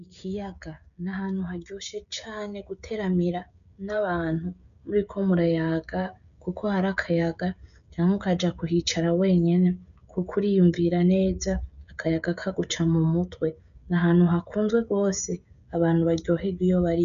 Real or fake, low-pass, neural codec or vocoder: fake; 7.2 kHz; codec, 16 kHz, 16 kbps, FreqCodec, smaller model